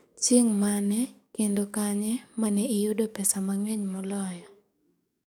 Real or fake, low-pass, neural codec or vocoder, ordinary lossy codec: fake; none; codec, 44.1 kHz, 7.8 kbps, DAC; none